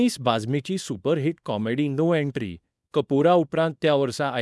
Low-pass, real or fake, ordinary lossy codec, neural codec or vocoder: none; fake; none; codec, 24 kHz, 0.9 kbps, WavTokenizer, small release